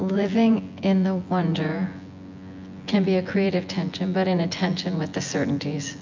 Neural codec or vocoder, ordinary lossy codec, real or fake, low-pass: vocoder, 24 kHz, 100 mel bands, Vocos; MP3, 64 kbps; fake; 7.2 kHz